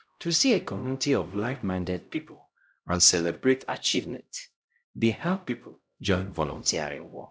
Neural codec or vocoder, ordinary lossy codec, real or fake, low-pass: codec, 16 kHz, 0.5 kbps, X-Codec, HuBERT features, trained on LibriSpeech; none; fake; none